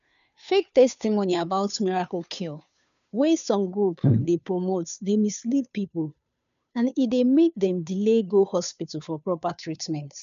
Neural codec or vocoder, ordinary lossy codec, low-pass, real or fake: codec, 16 kHz, 2 kbps, FunCodec, trained on Chinese and English, 25 frames a second; none; 7.2 kHz; fake